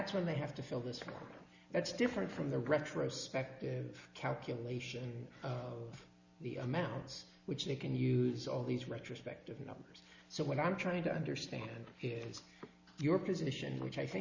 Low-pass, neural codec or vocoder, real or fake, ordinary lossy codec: 7.2 kHz; none; real; Opus, 64 kbps